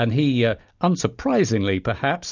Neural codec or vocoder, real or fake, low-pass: none; real; 7.2 kHz